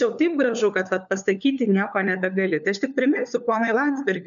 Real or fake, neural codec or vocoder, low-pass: fake; codec, 16 kHz, 4 kbps, FunCodec, trained on LibriTTS, 50 frames a second; 7.2 kHz